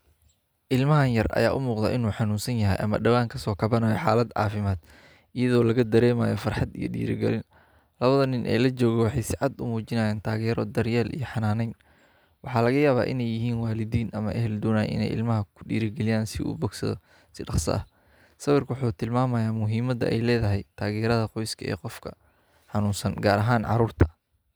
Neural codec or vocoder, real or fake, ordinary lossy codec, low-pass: none; real; none; none